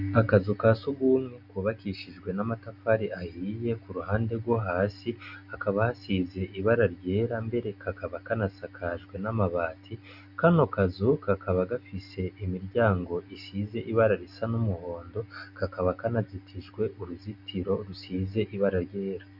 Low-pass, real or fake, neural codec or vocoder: 5.4 kHz; real; none